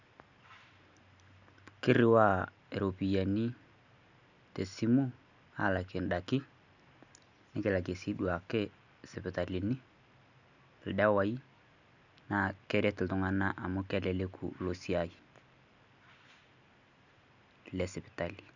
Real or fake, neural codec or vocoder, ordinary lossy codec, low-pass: real; none; none; 7.2 kHz